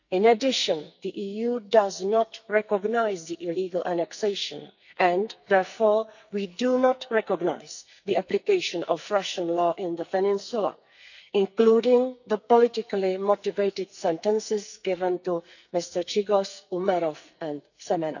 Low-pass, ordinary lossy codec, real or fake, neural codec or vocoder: 7.2 kHz; none; fake; codec, 44.1 kHz, 2.6 kbps, SNAC